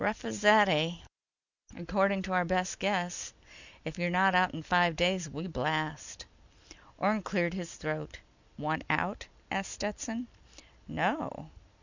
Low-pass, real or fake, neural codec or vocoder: 7.2 kHz; real; none